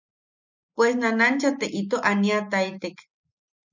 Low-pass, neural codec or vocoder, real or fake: 7.2 kHz; none; real